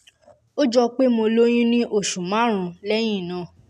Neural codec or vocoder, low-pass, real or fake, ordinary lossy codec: none; 14.4 kHz; real; none